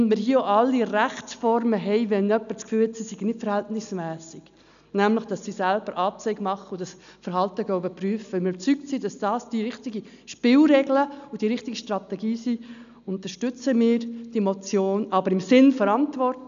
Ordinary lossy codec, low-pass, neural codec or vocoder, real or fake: none; 7.2 kHz; none; real